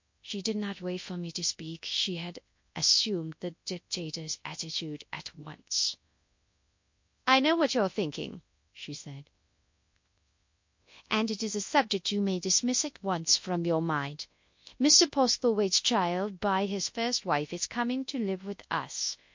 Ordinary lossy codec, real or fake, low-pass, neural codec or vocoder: MP3, 48 kbps; fake; 7.2 kHz; codec, 24 kHz, 0.9 kbps, WavTokenizer, large speech release